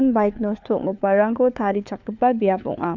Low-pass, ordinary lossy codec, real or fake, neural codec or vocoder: 7.2 kHz; none; fake; codec, 16 kHz, 4 kbps, FunCodec, trained on LibriTTS, 50 frames a second